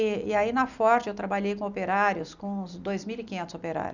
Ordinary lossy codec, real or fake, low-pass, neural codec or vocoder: none; real; 7.2 kHz; none